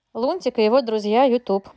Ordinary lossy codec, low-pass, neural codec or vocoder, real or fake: none; none; none; real